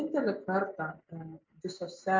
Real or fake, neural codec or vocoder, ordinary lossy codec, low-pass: real; none; MP3, 48 kbps; 7.2 kHz